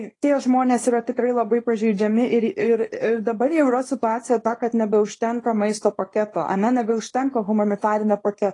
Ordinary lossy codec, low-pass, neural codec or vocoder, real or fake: AAC, 32 kbps; 10.8 kHz; codec, 24 kHz, 0.9 kbps, WavTokenizer, small release; fake